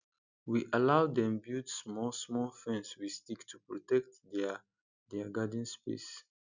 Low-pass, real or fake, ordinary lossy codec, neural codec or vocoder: 7.2 kHz; real; none; none